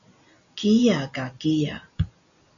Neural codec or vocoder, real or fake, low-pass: none; real; 7.2 kHz